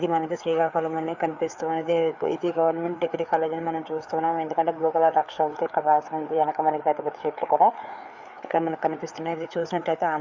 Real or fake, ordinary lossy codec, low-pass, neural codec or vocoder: fake; Opus, 64 kbps; 7.2 kHz; codec, 16 kHz, 4 kbps, FreqCodec, larger model